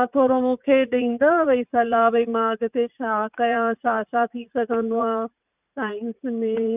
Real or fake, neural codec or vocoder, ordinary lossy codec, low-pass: fake; vocoder, 44.1 kHz, 80 mel bands, Vocos; none; 3.6 kHz